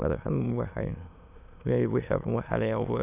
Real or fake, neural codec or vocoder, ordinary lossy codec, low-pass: fake; autoencoder, 22.05 kHz, a latent of 192 numbers a frame, VITS, trained on many speakers; none; 3.6 kHz